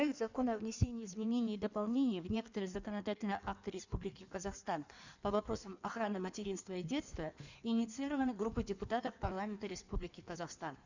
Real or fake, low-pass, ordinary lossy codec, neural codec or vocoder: fake; 7.2 kHz; none; codec, 16 kHz in and 24 kHz out, 1.1 kbps, FireRedTTS-2 codec